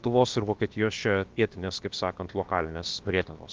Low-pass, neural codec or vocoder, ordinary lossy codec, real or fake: 7.2 kHz; codec, 16 kHz, about 1 kbps, DyCAST, with the encoder's durations; Opus, 32 kbps; fake